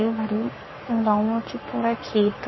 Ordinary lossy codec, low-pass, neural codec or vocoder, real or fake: MP3, 24 kbps; 7.2 kHz; codec, 44.1 kHz, 7.8 kbps, Pupu-Codec; fake